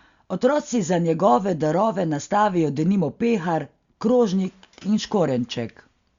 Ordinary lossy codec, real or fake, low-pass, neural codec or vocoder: Opus, 64 kbps; real; 7.2 kHz; none